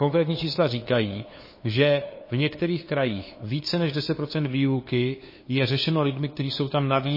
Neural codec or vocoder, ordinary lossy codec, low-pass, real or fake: codec, 16 kHz, 2 kbps, FunCodec, trained on LibriTTS, 25 frames a second; MP3, 24 kbps; 5.4 kHz; fake